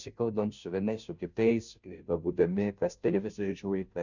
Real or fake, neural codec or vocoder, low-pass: fake; codec, 16 kHz, 0.5 kbps, FunCodec, trained on Chinese and English, 25 frames a second; 7.2 kHz